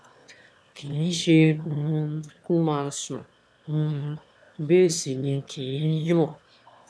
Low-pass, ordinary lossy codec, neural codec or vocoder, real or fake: none; none; autoencoder, 22.05 kHz, a latent of 192 numbers a frame, VITS, trained on one speaker; fake